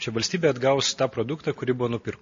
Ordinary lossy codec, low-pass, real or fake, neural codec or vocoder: MP3, 32 kbps; 7.2 kHz; real; none